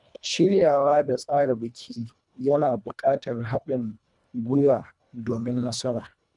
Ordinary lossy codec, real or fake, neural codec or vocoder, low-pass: none; fake; codec, 24 kHz, 1.5 kbps, HILCodec; 10.8 kHz